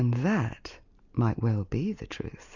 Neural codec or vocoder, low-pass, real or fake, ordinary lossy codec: none; 7.2 kHz; real; Opus, 64 kbps